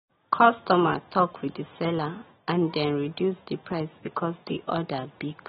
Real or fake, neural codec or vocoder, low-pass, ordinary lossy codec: real; none; 7.2 kHz; AAC, 16 kbps